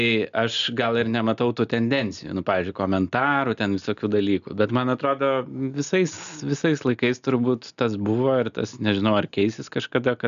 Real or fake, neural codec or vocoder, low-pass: real; none; 7.2 kHz